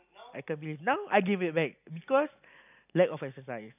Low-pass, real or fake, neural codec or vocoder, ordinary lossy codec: 3.6 kHz; real; none; none